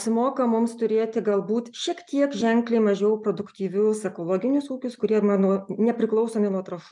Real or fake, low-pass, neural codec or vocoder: real; 10.8 kHz; none